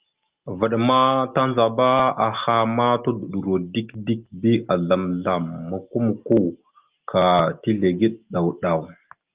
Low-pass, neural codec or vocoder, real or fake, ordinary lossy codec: 3.6 kHz; none; real; Opus, 32 kbps